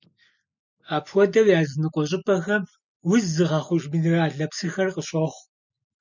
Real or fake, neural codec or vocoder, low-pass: real; none; 7.2 kHz